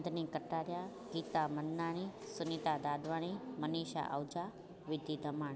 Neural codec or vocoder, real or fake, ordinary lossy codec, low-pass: none; real; none; none